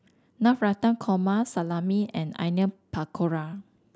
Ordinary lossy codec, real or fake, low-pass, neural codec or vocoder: none; real; none; none